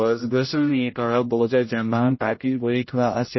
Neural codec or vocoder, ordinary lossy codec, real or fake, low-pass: codec, 16 kHz, 0.5 kbps, X-Codec, HuBERT features, trained on general audio; MP3, 24 kbps; fake; 7.2 kHz